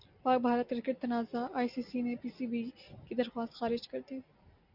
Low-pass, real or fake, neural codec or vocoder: 5.4 kHz; real; none